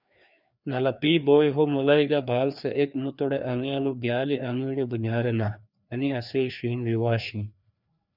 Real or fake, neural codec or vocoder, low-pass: fake; codec, 16 kHz, 2 kbps, FreqCodec, larger model; 5.4 kHz